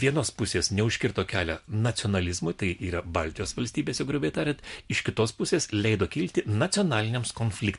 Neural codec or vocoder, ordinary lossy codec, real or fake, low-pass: none; MP3, 64 kbps; real; 10.8 kHz